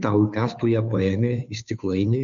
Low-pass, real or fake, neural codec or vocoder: 7.2 kHz; fake; codec, 16 kHz, 2 kbps, FunCodec, trained on Chinese and English, 25 frames a second